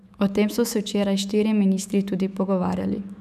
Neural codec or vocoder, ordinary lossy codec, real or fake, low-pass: autoencoder, 48 kHz, 128 numbers a frame, DAC-VAE, trained on Japanese speech; none; fake; 14.4 kHz